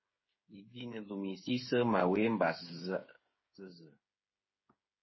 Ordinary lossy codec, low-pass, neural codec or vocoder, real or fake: MP3, 24 kbps; 7.2 kHz; codec, 16 kHz, 8 kbps, FreqCodec, smaller model; fake